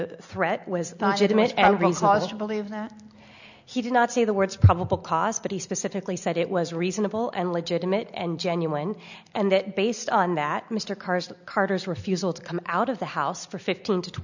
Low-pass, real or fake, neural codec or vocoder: 7.2 kHz; real; none